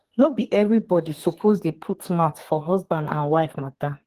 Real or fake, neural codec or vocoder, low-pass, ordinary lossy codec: fake; codec, 32 kHz, 1.9 kbps, SNAC; 14.4 kHz; Opus, 16 kbps